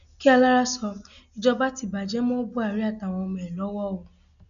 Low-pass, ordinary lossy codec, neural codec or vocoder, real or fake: 7.2 kHz; none; none; real